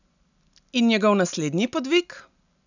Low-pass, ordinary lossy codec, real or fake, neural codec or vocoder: 7.2 kHz; none; real; none